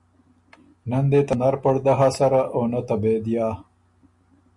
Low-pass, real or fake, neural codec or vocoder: 10.8 kHz; real; none